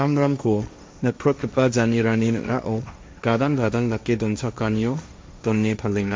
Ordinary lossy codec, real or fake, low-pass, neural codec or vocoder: none; fake; none; codec, 16 kHz, 1.1 kbps, Voila-Tokenizer